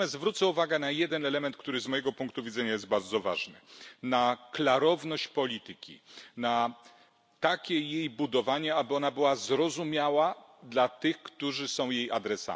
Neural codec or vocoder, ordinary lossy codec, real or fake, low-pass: none; none; real; none